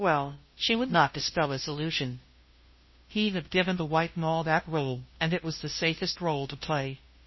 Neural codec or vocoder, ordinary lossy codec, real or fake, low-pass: codec, 16 kHz, 0.5 kbps, FunCodec, trained on Chinese and English, 25 frames a second; MP3, 24 kbps; fake; 7.2 kHz